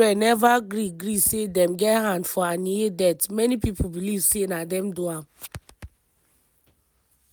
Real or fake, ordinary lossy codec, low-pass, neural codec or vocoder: real; none; none; none